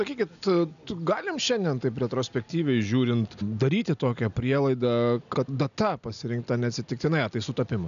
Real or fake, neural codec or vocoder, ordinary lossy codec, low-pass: real; none; MP3, 96 kbps; 7.2 kHz